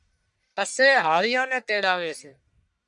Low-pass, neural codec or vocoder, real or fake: 10.8 kHz; codec, 44.1 kHz, 1.7 kbps, Pupu-Codec; fake